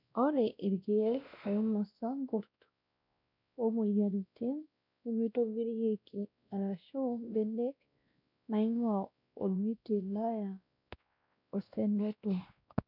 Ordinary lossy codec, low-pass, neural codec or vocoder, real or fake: none; 5.4 kHz; codec, 16 kHz, 1 kbps, X-Codec, WavLM features, trained on Multilingual LibriSpeech; fake